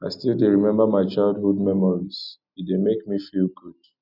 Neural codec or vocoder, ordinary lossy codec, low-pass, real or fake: none; none; 5.4 kHz; real